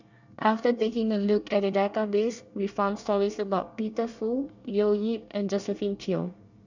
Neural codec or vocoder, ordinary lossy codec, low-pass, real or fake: codec, 24 kHz, 1 kbps, SNAC; none; 7.2 kHz; fake